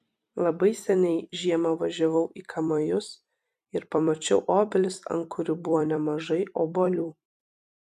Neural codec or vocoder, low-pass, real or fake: vocoder, 44.1 kHz, 128 mel bands every 256 samples, BigVGAN v2; 14.4 kHz; fake